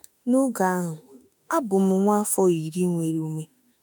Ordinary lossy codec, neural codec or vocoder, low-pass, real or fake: none; autoencoder, 48 kHz, 32 numbers a frame, DAC-VAE, trained on Japanese speech; none; fake